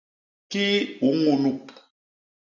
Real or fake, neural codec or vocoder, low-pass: real; none; 7.2 kHz